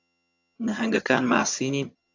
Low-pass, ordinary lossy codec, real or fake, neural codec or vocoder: 7.2 kHz; AAC, 48 kbps; fake; vocoder, 22.05 kHz, 80 mel bands, HiFi-GAN